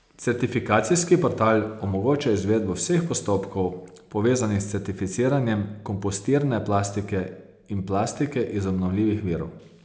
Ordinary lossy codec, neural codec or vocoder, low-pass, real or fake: none; none; none; real